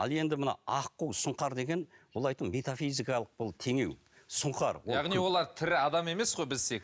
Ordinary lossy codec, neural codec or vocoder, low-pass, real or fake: none; none; none; real